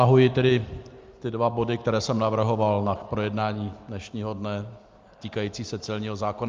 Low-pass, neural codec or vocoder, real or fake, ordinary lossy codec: 7.2 kHz; none; real; Opus, 24 kbps